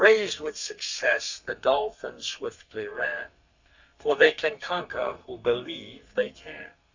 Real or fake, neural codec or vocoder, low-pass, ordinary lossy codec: fake; codec, 32 kHz, 1.9 kbps, SNAC; 7.2 kHz; Opus, 64 kbps